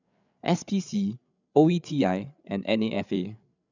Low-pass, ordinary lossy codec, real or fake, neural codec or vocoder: 7.2 kHz; none; fake; codec, 16 kHz, 8 kbps, FreqCodec, larger model